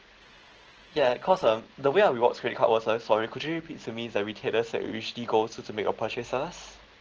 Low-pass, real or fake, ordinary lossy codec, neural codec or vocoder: 7.2 kHz; real; Opus, 16 kbps; none